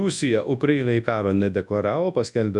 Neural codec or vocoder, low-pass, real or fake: codec, 24 kHz, 0.9 kbps, WavTokenizer, large speech release; 10.8 kHz; fake